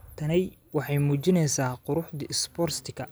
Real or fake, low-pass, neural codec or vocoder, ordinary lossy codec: real; none; none; none